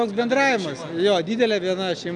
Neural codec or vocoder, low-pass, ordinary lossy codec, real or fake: none; 9.9 kHz; MP3, 96 kbps; real